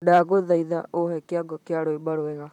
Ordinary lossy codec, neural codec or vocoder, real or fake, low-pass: none; vocoder, 44.1 kHz, 128 mel bands, Pupu-Vocoder; fake; 19.8 kHz